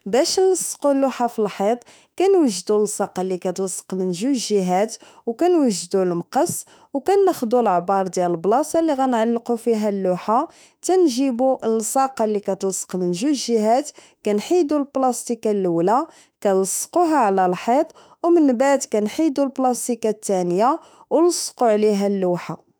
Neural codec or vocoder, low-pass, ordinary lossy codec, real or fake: autoencoder, 48 kHz, 32 numbers a frame, DAC-VAE, trained on Japanese speech; none; none; fake